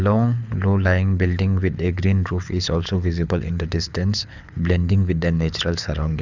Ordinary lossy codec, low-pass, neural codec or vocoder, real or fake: none; 7.2 kHz; codec, 24 kHz, 6 kbps, HILCodec; fake